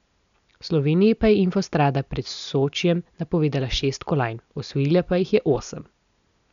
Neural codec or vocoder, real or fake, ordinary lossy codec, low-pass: none; real; MP3, 96 kbps; 7.2 kHz